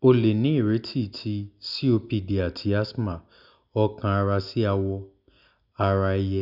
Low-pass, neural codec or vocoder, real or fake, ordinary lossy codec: 5.4 kHz; none; real; none